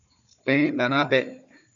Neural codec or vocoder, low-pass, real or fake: codec, 16 kHz, 4 kbps, FunCodec, trained on Chinese and English, 50 frames a second; 7.2 kHz; fake